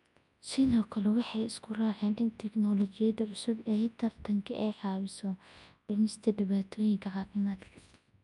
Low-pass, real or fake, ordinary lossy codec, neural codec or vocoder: 10.8 kHz; fake; none; codec, 24 kHz, 0.9 kbps, WavTokenizer, large speech release